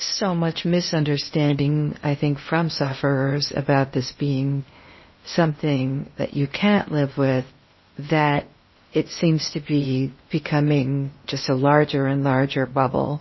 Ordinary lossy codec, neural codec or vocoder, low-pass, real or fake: MP3, 24 kbps; codec, 16 kHz in and 24 kHz out, 0.8 kbps, FocalCodec, streaming, 65536 codes; 7.2 kHz; fake